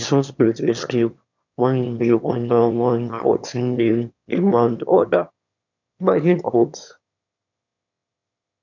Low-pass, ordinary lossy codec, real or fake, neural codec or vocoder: 7.2 kHz; none; fake; autoencoder, 22.05 kHz, a latent of 192 numbers a frame, VITS, trained on one speaker